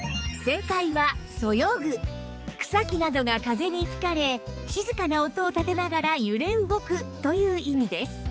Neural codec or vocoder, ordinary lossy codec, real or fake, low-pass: codec, 16 kHz, 4 kbps, X-Codec, HuBERT features, trained on balanced general audio; none; fake; none